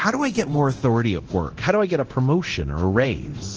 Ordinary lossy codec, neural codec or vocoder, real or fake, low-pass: Opus, 16 kbps; codec, 24 kHz, 0.9 kbps, DualCodec; fake; 7.2 kHz